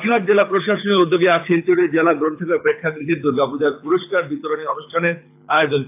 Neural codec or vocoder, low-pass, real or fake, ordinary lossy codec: codec, 24 kHz, 6 kbps, HILCodec; 3.6 kHz; fake; AAC, 32 kbps